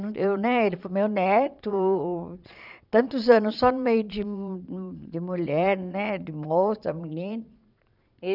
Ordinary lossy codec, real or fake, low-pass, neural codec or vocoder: none; fake; 5.4 kHz; vocoder, 22.05 kHz, 80 mel bands, Vocos